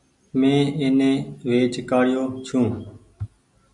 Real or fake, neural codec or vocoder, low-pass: real; none; 10.8 kHz